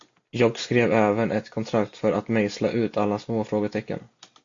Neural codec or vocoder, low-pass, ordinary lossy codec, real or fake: none; 7.2 kHz; AAC, 48 kbps; real